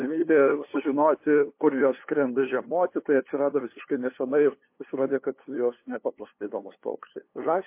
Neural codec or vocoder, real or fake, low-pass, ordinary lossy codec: codec, 16 kHz, 2 kbps, FunCodec, trained on LibriTTS, 25 frames a second; fake; 3.6 kHz; MP3, 24 kbps